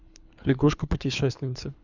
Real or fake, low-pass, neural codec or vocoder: fake; 7.2 kHz; codec, 24 kHz, 3 kbps, HILCodec